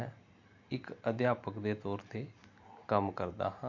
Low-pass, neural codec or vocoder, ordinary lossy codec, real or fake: 7.2 kHz; none; MP3, 48 kbps; real